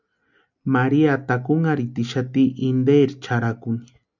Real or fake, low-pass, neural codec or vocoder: real; 7.2 kHz; none